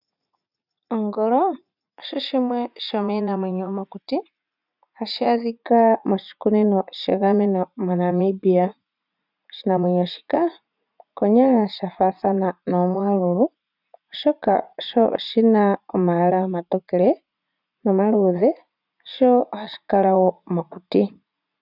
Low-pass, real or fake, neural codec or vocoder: 5.4 kHz; fake; vocoder, 44.1 kHz, 80 mel bands, Vocos